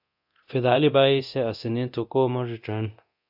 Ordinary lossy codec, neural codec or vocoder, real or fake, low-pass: AAC, 48 kbps; codec, 24 kHz, 0.9 kbps, DualCodec; fake; 5.4 kHz